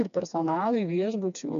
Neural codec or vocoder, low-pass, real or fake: codec, 16 kHz, 2 kbps, FreqCodec, smaller model; 7.2 kHz; fake